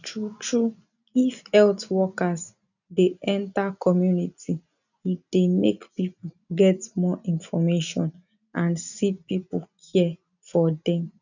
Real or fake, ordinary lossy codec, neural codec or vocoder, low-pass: real; none; none; 7.2 kHz